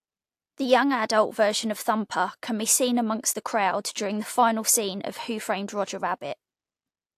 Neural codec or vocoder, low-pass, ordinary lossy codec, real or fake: none; 14.4 kHz; AAC, 64 kbps; real